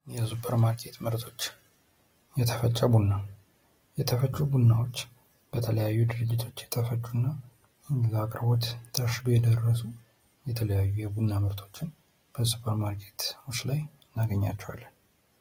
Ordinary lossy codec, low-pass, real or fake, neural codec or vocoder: AAC, 48 kbps; 19.8 kHz; real; none